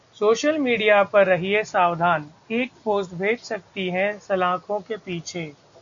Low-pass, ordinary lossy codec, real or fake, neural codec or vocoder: 7.2 kHz; AAC, 64 kbps; real; none